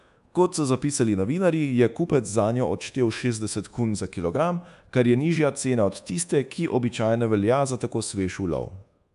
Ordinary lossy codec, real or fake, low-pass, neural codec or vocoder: MP3, 96 kbps; fake; 10.8 kHz; codec, 24 kHz, 1.2 kbps, DualCodec